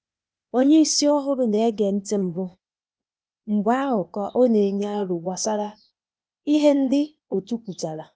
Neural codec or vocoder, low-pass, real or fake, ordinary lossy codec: codec, 16 kHz, 0.8 kbps, ZipCodec; none; fake; none